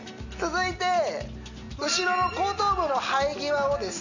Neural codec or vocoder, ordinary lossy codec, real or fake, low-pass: none; none; real; 7.2 kHz